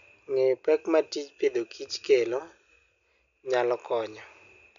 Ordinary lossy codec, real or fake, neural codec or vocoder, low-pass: none; real; none; 7.2 kHz